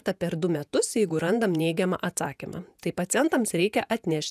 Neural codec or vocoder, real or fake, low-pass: vocoder, 48 kHz, 128 mel bands, Vocos; fake; 14.4 kHz